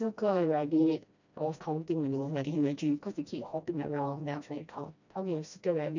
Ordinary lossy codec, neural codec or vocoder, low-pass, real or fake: none; codec, 16 kHz, 1 kbps, FreqCodec, smaller model; 7.2 kHz; fake